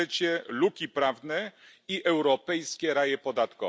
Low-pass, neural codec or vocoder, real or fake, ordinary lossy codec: none; none; real; none